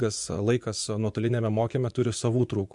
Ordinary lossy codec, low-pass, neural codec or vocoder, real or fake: MP3, 64 kbps; 10.8 kHz; vocoder, 24 kHz, 100 mel bands, Vocos; fake